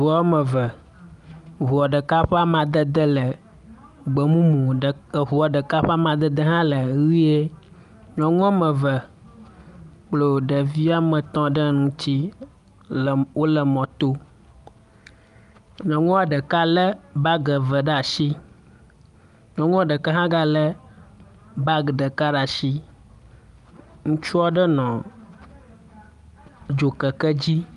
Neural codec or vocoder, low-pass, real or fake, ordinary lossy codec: none; 10.8 kHz; real; Opus, 32 kbps